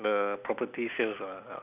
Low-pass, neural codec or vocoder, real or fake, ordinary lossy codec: 3.6 kHz; none; real; none